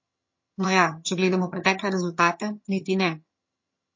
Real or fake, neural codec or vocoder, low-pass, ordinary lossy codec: fake; vocoder, 22.05 kHz, 80 mel bands, HiFi-GAN; 7.2 kHz; MP3, 32 kbps